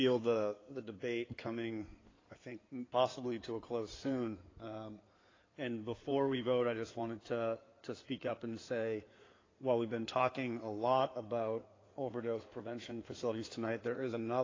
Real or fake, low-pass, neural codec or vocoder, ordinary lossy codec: fake; 7.2 kHz; codec, 16 kHz in and 24 kHz out, 2.2 kbps, FireRedTTS-2 codec; AAC, 32 kbps